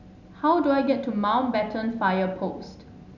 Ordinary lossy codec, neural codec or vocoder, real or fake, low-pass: none; none; real; 7.2 kHz